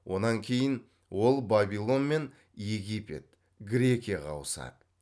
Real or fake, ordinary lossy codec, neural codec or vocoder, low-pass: real; none; none; none